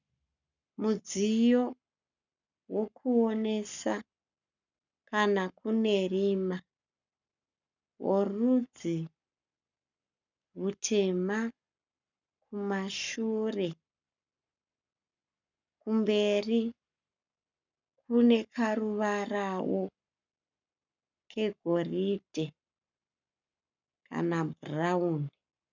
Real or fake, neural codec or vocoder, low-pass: fake; codec, 44.1 kHz, 7.8 kbps, Pupu-Codec; 7.2 kHz